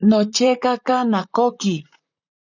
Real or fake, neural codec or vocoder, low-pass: fake; vocoder, 44.1 kHz, 128 mel bands, Pupu-Vocoder; 7.2 kHz